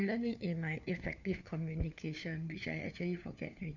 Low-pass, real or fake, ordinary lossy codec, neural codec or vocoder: 7.2 kHz; fake; none; codec, 16 kHz, 4 kbps, FunCodec, trained on LibriTTS, 50 frames a second